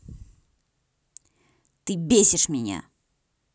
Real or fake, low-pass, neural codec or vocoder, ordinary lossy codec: real; none; none; none